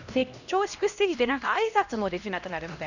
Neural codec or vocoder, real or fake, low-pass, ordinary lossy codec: codec, 16 kHz, 1 kbps, X-Codec, HuBERT features, trained on LibriSpeech; fake; 7.2 kHz; none